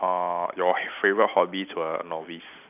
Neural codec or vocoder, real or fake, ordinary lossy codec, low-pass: none; real; none; 3.6 kHz